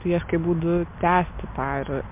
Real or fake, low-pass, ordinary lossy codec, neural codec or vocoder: real; 3.6 kHz; MP3, 32 kbps; none